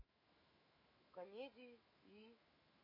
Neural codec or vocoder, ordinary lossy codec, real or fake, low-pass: autoencoder, 48 kHz, 128 numbers a frame, DAC-VAE, trained on Japanese speech; MP3, 24 kbps; fake; 5.4 kHz